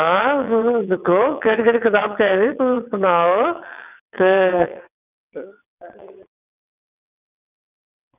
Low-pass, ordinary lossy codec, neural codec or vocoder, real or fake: 3.6 kHz; none; vocoder, 22.05 kHz, 80 mel bands, WaveNeXt; fake